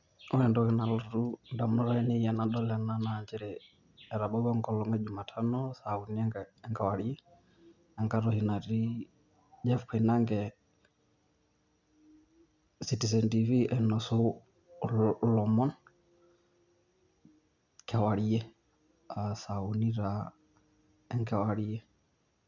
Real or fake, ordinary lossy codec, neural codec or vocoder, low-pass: real; none; none; 7.2 kHz